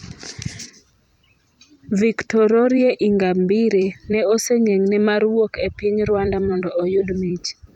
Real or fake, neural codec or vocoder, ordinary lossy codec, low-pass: fake; vocoder, 44.1 kHz, 128 mel bands every 256 samples, BigVGAN v2; none; 19.8 kHz